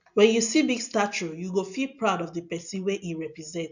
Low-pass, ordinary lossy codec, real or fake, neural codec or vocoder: 7.2 kHz; none; real; none